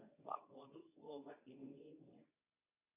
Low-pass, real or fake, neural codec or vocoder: 3.6 kHz; fake; codec, 24 kHz, 0.9 kbps, WavTokenizer, medium speech release version 2